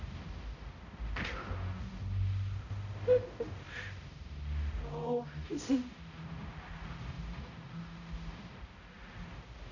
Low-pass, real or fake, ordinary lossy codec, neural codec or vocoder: 7.2 kHz; fake; none; codec, 16 kHz, 0.5 kbps, X-Codec, HuBERT features, trained on balanced general audio